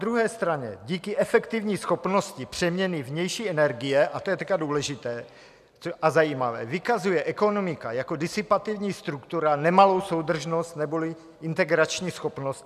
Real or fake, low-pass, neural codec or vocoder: real; 14.4 kHz; none